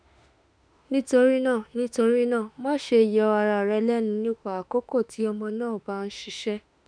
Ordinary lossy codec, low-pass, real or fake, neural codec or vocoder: none; 9.9 kHz; fake; autoencoder, 48 kHz, 32 numbers a frame, DAC-VAE, trained on Japanese speech